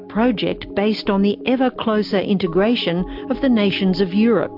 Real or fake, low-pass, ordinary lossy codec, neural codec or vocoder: real; 5.4 kHz; MP3, 48 kbps; none